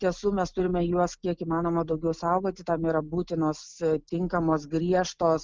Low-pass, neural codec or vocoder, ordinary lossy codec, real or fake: 7.2 kHz; none; Opus, 24 kbps; real